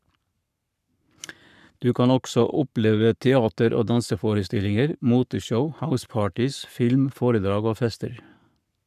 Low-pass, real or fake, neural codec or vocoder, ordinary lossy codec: 14.4 kHz; fake; codec, 44.1 kHz, 7.8 kbps, Pupu-Codec; none